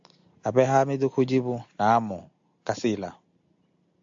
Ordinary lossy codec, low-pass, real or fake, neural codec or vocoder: AAC, 64 kbps; 7.2 kHz; real; none